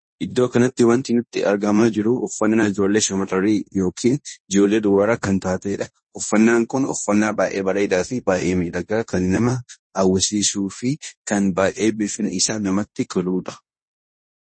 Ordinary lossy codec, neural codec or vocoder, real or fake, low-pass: MP3, 32 kbps; codec, 16 kHz in and 24 kHz out, 0.9 kbps, LongCat-Audio-Codec, fine tuned four codebook decoder; fake; 9.9 kHz